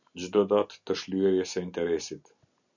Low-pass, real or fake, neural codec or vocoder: 7.2 kHz; real; none